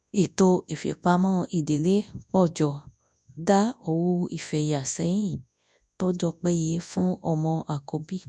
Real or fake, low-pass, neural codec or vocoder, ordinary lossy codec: fake; 10.8 kHz; codec, 24 kHz, 0.9 kbps, WavTokenizer, large speech release; none